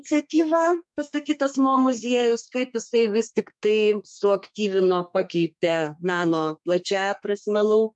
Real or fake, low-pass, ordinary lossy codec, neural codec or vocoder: fake; 10.8 kHz; MP3, 64 kbps; codec, 32 kHz, 1.9 kbps, SNAC